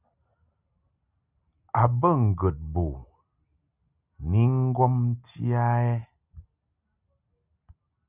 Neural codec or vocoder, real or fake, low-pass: none; real; 3.6 kHz